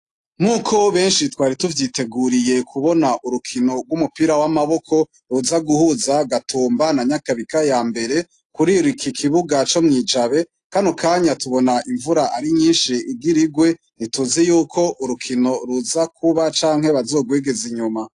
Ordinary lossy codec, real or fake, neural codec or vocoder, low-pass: AAC, 48 kbps; real; none; 10.8 kHz